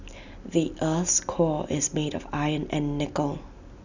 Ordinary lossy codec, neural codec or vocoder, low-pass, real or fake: none; none; 7.2 kHz; real